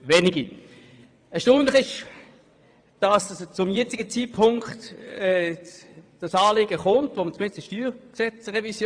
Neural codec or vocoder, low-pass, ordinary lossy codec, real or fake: vocoder, 22.05 kHz, 80 mel bands, WaveNeXt; 9.9 kHz; AAC, 64 kbps; fake